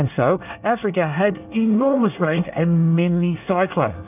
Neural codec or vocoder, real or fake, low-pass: codec, 24 kHz, 1 kbps, SNAC; fake; 3.6 kHz